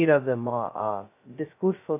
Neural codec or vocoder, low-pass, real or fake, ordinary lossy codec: codec, 16 kHz, 0.2 kbps, FocalCodec; 3.6 kHz; fake; AAC, 24 kbps